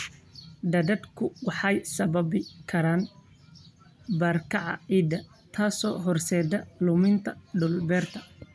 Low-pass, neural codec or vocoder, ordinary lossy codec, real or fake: none; none; none; real